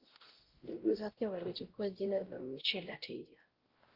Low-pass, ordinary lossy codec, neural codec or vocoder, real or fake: 5.4 kHz; Opus, 16 kbps; codec, 16 kHz, 0.5 kbps, X-Codec, WavLM features, trained on Multilingual LibriSpeech; fake